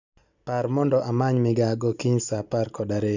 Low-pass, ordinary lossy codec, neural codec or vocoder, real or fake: 7.2 kHz; none; none; real